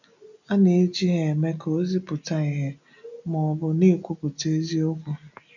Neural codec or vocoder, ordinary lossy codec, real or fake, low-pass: none; none; real; 7.2 kHz